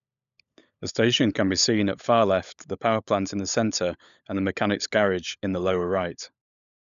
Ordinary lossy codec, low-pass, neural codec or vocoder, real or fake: none; 7.2 kHz; codec, 16 kHz, 16 kbps, FunCodec, trained on LibriTTS, 50 frames a second; fake